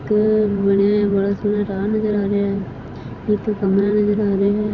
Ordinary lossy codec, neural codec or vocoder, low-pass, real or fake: none; vocoder, 44.1 kHz, 128 mel bands every 512 samples, BigVGAN v2; 7.2 kHz; fake